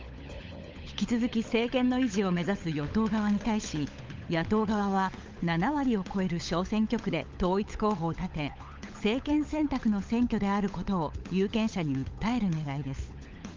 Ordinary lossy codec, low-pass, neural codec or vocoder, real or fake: Opus, 32 kbps; 7.2 kHz; codec, 16 kHz, 16 kbps, FunCodec, trained on LibriTTS, 50 frames a second; fake